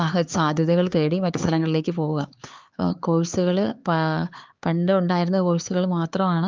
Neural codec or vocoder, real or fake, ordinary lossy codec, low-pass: codec, 16 kHz, 4 kbps, X-Codec, HuBERT features, trained on LibriSpeech; fake; Opus, 32 kbps; 7.2 kHz